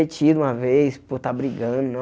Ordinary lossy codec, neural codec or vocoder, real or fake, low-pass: none; none; real; none